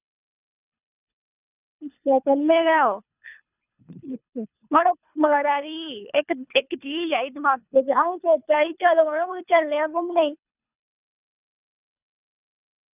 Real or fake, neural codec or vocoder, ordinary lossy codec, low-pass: fake; codec, 24 kHz, 3 kbps, HILCodec; none; 3.6 kHz